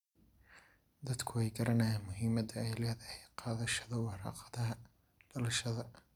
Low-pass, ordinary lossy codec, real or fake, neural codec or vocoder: 19.8 kHz; none; real; none